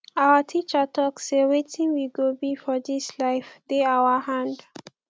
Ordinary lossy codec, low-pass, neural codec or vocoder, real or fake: none; none; none; real